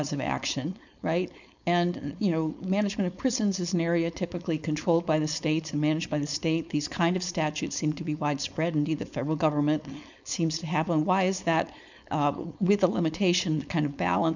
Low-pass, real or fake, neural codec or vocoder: 7.2 kHz; fake; codec, 16 kHz, 4.8 kbps, FACodec